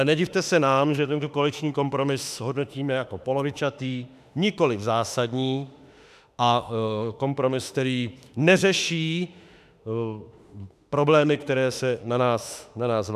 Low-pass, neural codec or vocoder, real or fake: 14.4 kHz; autoencoder, 48 kHz, 32 numbers a frame, DAC-VAE, trained on Japanese speech; fake